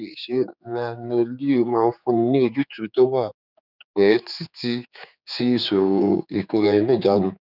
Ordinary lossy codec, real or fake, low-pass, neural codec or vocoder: none; fake; 5.4 kHz; codec, 16 kHz, 4 kbps, X-Codec, HuBERT features, trained on general audio